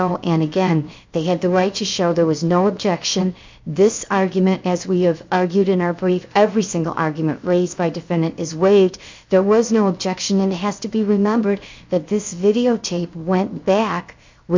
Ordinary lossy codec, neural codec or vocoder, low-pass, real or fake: MP3, 64 kbps; codec, 16 kHz, 0.7 kbps, FocalCodec; 7.2 kHz; fake